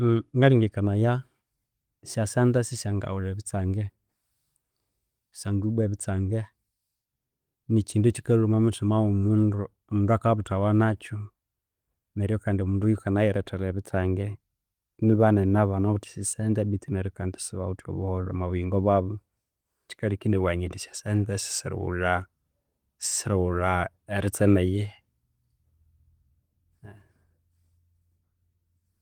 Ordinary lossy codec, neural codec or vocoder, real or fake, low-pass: Opus, 24 kbps; none; real; 19.8 kHz